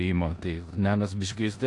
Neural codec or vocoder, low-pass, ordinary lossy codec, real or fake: codec, 16 kHz in and 24 kHz out, 0.9 kbps, LongCat-Audio-Codec, four codebook decoder; 10.8 kHz; AAC, 48 kbps; fake